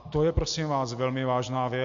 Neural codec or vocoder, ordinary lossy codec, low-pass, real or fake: none; MP3, 48 kbps; 7.2 kHz; real